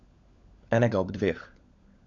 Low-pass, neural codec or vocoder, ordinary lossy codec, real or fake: 7.2 kHz; codec, 16 kHz, 16 kbps, FunCodec, trained on LibriTTS, 50 frames a second; MP3, 64 kbps; fake